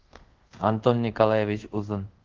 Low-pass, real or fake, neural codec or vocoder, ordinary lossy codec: 7.2 kHz; fake; codec, 24 kHz, 0.5 kbps, DualCodec; Opus, 16 kbps